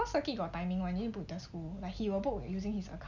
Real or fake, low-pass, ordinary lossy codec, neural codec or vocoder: real; 7.2 kHz; none; none